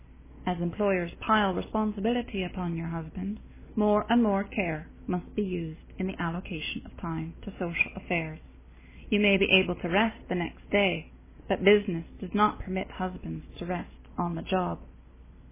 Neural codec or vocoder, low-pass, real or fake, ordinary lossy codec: none; 3.6 kHz; real; MP3, 16 kbps